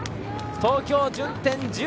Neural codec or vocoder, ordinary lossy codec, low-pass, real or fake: none; none; none; real